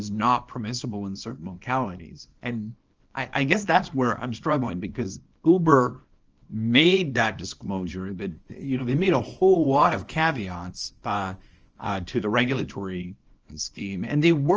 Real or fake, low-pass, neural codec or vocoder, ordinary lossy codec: fake; 7.2 kHz; codec, 24 kHz, 0.9 kbps, WavTokenizer, small release; Opus, 24 kbps